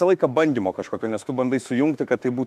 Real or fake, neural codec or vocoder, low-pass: fake; autoencoder, 48 kHz, 32 numbers a frame, DAC-VAE, trained on Japanese speech; 14.4 kHz